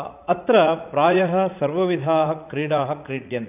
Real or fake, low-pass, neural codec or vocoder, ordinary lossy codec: fake; 3.6 kHz; vocoder, 44.1 kHz, 80 mel bands, Vocos; AAC, 32 kbps